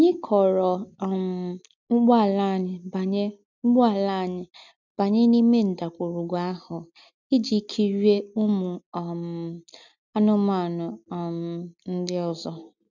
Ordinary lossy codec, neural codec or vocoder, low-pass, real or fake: none; none; 7.2 kHz; real